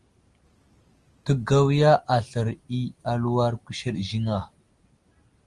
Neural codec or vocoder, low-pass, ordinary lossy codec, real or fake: none; 10.8 kHz; Opus, 24 kbps; real